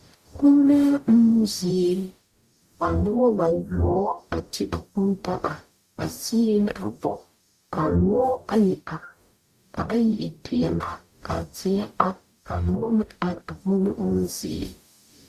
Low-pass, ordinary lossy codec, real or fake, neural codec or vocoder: 14.4 kHz; Opus, 64 kbps; fake; codec, 44.1 kHz, 0.9 kbps, DAC